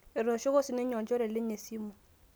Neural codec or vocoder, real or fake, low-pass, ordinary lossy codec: none; real; none; none